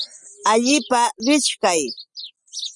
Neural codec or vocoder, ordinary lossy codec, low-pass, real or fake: none; Opus, 64 kbps; 10.8 kHz; real